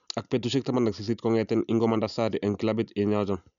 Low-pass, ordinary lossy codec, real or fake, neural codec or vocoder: 7.2 kHz; none; real; none